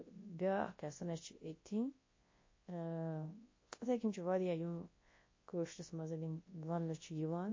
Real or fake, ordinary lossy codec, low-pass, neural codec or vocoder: fake; MP3, 32 kbps; 7.2 kHz; codec, 24 kHz, 0.9 kbps, WavTokenizer, large speech release